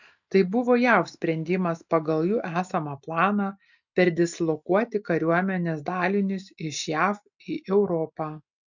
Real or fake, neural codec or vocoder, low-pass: real; none; 7.2 kHz